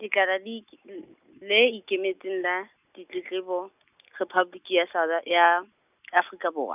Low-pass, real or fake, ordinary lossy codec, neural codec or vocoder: 3.6 kHz; real; none; none